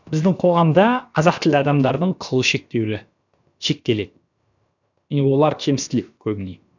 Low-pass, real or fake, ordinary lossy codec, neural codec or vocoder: 7.2 kHz; fake; none; codec, 16 kHz, about 1 kbps, DyCAST, with the encoder's durations